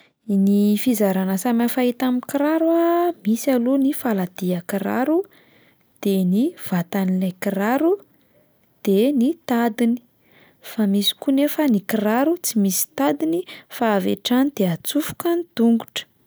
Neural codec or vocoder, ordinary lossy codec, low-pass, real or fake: none; none; none; real